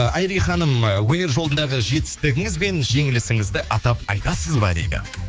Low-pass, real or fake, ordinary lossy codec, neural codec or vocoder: none; fake; none; codec, 16 kHz, 4 kbps, X-Codec, HuBERT features, trained on general audio